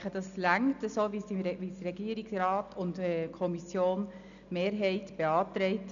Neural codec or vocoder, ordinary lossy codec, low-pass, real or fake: none; none; 7.2 kHz; real